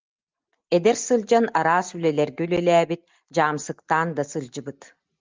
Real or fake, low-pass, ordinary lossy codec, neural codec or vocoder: real; 7.2 kHz; Opus, 32 kbps; none